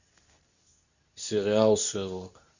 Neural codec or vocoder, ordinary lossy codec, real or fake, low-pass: codec, 24 kHz, 0.9 kbps, WavTokenizer, medium speech release version 2; none; fake; 7.2 kHz